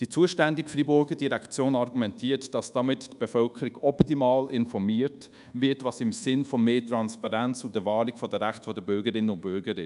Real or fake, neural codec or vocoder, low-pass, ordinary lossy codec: fake; codec, 24 kHz, 1.2 kbps, DualCodec; 10.8 kHz; none